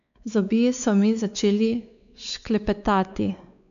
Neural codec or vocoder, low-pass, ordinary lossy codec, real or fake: codec, 16 kHz, 4 kbps, X-Codec, WavLM features, trained on Multilingual LibriSpeech; 7.2 kHz; none; fake